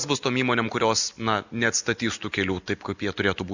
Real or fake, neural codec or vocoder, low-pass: real; none; 7.2 kHz